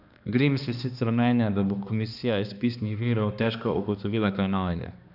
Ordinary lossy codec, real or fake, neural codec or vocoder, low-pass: none; fake; codec, 16 kHz, 2 kbps, X-Codec, HuBERT features, trained on balanced general audio; 5.4 kHz